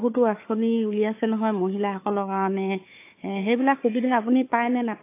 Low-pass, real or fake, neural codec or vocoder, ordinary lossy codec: 3.6 kHz; fake; codec, 16 kHz, 4 kbps, FunCodec, trained on Chinese and English, 50 frames a second; AAC, 24 kbps